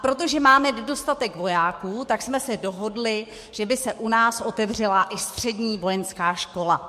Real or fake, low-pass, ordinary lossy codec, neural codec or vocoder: fake; 14.4 kHz; MP3, 64 kbps; codec, 44.1 kHz, 7.8 kbps, DAC